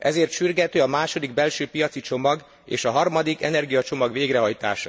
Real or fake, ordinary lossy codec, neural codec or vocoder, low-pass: real; none; none; none